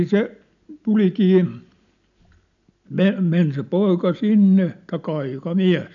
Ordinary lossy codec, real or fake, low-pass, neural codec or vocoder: none; real; 7.2 kHz; none